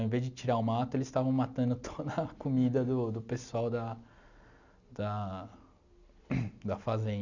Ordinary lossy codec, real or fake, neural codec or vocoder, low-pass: none; real; none; 7.2 kHz